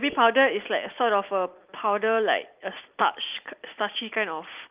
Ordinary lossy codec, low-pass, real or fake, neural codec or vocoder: Opus, 32 kbps; 3.6 kHz; real; none